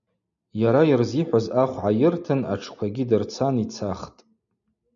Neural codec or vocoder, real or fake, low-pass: none; real; 7.2 kHz